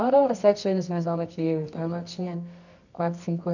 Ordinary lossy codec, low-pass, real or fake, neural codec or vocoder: none; 7.2 kHz; fake; codec, 24 kHz, 0.9 kbps, WavTokenizer, medium music audio release